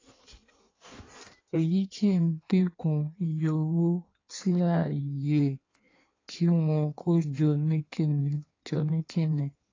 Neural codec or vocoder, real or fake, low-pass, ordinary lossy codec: codec, 16 kHz in and 24 kHz out, 1.1 kbps, FireRedTTS-2 codec; fake; 7.2 kHz; AAC, 32 kbps